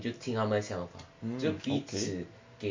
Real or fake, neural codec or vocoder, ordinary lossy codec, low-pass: real; none; MP3, 64 kbps; 7.2 kHz